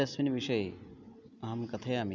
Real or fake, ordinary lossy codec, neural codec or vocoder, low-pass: real; none; none; 7.2 kHz